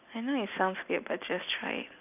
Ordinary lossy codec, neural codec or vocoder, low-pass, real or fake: none; vocoder, 44.1 kHz, 128 mel bands every 256 samples, BigVGAN v2; 3.6 kHz; fake